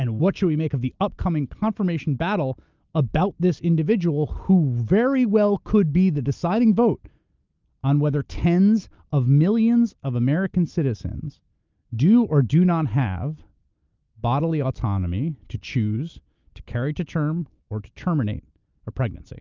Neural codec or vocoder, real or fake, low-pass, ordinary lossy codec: none; real; 7.2 kHz; Opus, 24 kbps